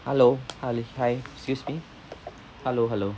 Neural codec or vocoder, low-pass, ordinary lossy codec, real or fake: none; none; none; real